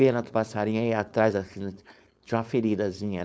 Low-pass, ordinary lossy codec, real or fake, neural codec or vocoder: none; none; fake; codec, 16 kHz, 4.8 kbps, FACodec